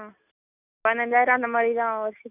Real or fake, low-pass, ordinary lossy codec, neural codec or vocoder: real; 3.6 kHz; none; none